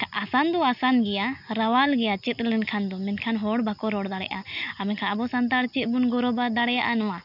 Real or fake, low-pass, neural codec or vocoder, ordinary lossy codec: real; 5.4 kHz; none; none